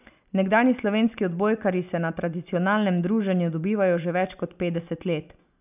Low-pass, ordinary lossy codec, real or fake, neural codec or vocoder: 3.6 kHz; none; real; none